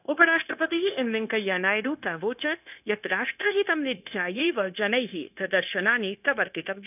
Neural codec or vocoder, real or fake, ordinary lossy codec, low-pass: codec, 16 kHz, 0.9 kbps, LongCat-Audio-Codec; fake; none; 3.6 kHz